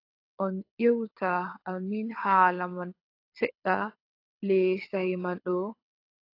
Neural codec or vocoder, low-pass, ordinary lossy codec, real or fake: codec, 24 kHz, 6 kbps, HILCodec; 5.4 kHz; AAC, 32 kbps; fake